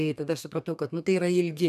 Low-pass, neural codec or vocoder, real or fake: 14.4 kHz; codec, 32 kHz, 1.9 kbps, SNAC; fake